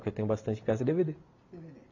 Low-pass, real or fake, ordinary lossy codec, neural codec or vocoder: 7.2 kHz; real; AAC, 48 kbps; none